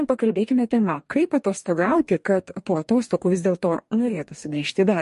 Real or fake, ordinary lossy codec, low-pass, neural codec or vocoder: fake; MP3, 48 kbps; 14.4 kHz; codec, 44.1 kHz, 2.6 kbps, DAC